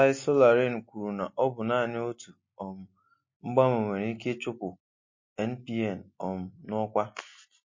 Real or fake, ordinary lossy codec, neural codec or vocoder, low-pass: real; MP3, 32 kbps; none; 7.2 kHz